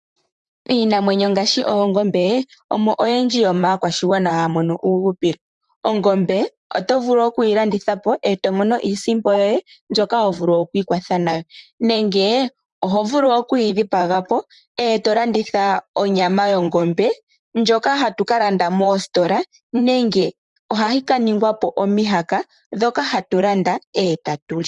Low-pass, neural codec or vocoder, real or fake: 10.8 kHz; vocoder, 44.1 kHz, 128 mel bands, Pupu-Vocoder; fake